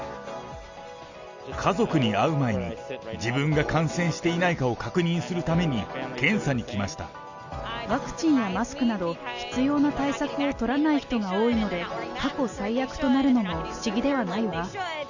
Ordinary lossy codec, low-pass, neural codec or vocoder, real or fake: Opus, 64 kbps; 7.2 kHz; none; real